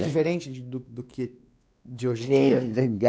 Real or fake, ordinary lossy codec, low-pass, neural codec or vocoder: fake; none; none; codec, 16 kHz, 2 kbps, X-Codec, WavLM features, trained on Multilingual LibriSpeech